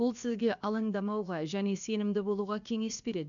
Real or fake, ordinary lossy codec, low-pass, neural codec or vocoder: fake; none; 7.2 kHz; codec, 16 kHz, about 1 kbps, DyCAST, with the encoder's durations